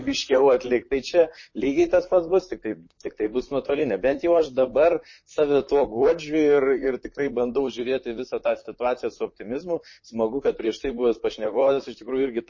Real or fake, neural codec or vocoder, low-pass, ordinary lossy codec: fake; vocoder, 44.1 kHz, 128 mel bands, Pupu-Vocoder; 7.2 kHz; MP3, 32 kbps